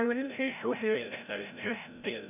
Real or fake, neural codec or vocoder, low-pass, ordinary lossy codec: fake; codec, 16 kHz, 0.5 kbps, FreqCodec, larger model; 3.6 kHz; none